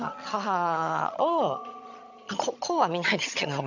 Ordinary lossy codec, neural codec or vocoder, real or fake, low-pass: none; vocoder, 22.05 kHz, 80 mel bands, HiFi-GAN; fake; 7.2 kHz